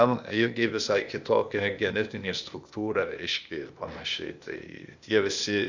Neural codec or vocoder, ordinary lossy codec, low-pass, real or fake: codec, 16 kHz, 0.8 kbps, ZipCodec; Opus, 64 kbps; 7.2 kHz; fake